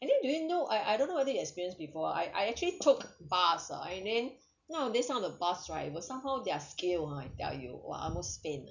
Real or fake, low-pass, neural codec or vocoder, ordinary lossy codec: real; 7.2 kHz; none; none